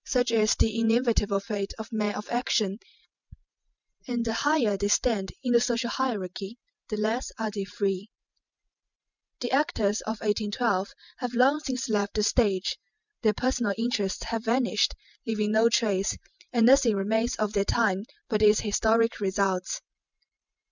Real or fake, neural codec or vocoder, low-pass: real; none; 7.2 kHz